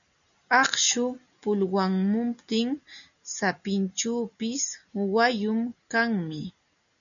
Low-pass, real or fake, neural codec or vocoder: 7.2 kHz; real; none